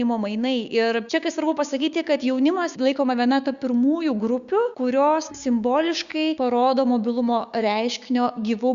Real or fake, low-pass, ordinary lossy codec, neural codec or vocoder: fake; 7.2 kHz; Opus, 64 kbps; codec, 16 kHz, 6 kbps, DAC